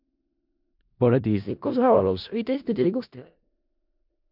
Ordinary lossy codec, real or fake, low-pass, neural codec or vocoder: MP3, 48 kbps; fake; 5.4 kHz; codec, 16 kHz in and 24 kHz out, 0.4 kbps, LongCat-Audio-Codec, four codebook decoder